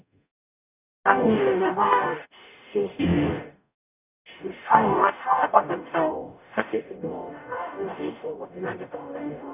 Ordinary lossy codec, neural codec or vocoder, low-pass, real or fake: none; codec, 44.1 kHz, 0.9 kbps, DAC; 3.6 kHz; fake